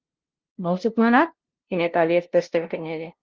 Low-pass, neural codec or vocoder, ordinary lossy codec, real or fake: 7.2 kHz; codec, 16 kHz, 0.5 kbps, FunCodec, trained on LibriTTS, 25 frames a second; Opus, 16 kbps; fake